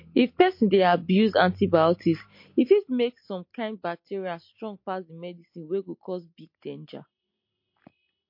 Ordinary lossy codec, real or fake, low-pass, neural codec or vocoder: MP3, 32 kbps; real; 5.4 kHz; none